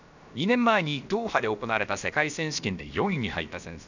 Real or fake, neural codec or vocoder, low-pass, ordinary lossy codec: fake; codec, 16 kHz, about 1 kbps, DyCAST, with the encoder's durations; 7.2 kHz; none